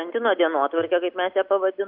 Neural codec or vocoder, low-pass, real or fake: none; 5.4 kHz; real